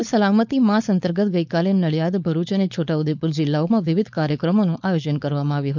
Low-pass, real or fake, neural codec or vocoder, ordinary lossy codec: 7.2 kHz; fake; codec, 16 kHz, 4.8 kbps, FACodec; none